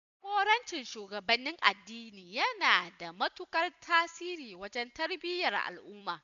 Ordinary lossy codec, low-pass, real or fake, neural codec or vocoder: MP3, 96 kbps; 7.2 kHz; real; none